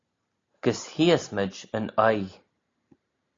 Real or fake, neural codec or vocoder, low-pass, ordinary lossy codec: real; none; 7.2 kHz; AAC, 32 kbps